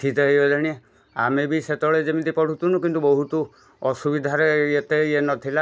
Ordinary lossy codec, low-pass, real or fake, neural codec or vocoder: none; none; real; none